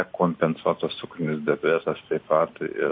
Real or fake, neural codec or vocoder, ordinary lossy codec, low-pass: real; none; MP3, 32 kbps; 5.4 kHz